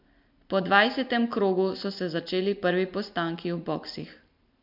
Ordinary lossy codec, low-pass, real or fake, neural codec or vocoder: MP3, 48 kbps; 5.4 kHz; real; none